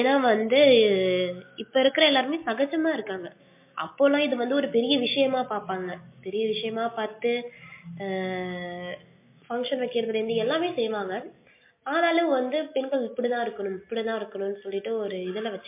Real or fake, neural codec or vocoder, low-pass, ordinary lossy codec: real; none; 3.6 kHz; MP3, 24 kbps